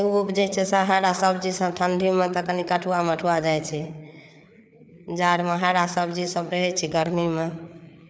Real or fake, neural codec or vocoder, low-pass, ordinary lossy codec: fake; codec, 16 kHz, 4 kbps, FreqCodec, larger model; none; none